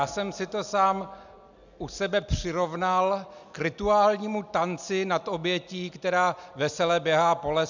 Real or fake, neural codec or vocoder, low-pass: real; none; 7.2 kHz